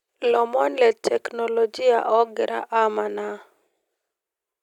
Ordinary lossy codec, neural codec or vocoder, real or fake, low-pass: none; none; real; 19.8 kHz